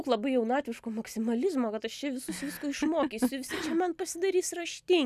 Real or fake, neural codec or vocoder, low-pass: real; none; 14.4 kHz